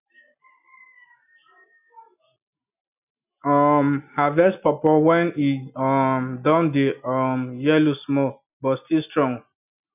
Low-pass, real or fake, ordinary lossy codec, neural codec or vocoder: 3.6 kHz; real; none; none